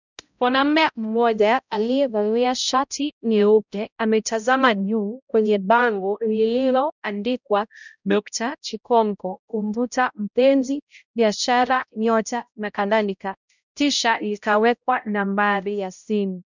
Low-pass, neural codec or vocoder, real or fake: 7.2 kHz; codec, 16 kHz, 0.5 kbps, X-Codec, HuBERT features, trained on balanced general audio; fake